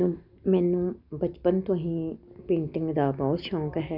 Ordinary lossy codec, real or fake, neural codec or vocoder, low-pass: none; real; none; 5.4 kHz